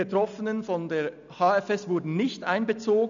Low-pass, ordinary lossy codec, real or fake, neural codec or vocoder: 7.2 kHz; none; real; none